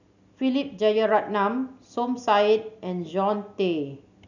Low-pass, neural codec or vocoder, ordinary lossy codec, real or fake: 7.2 kHz; none; none; real